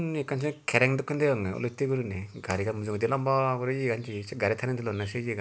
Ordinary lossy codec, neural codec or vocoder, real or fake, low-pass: none; none; real; none